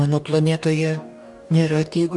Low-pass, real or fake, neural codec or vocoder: 10.8 kHz; fake; codec, 44.1 kHz, 2.6 kbps, DAC